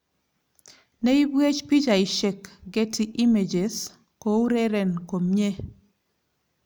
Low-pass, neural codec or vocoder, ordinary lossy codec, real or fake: none; none; none; real